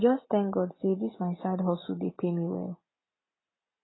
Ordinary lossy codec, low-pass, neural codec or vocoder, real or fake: AAC, 16 kbps; 7.2 kHz; none; real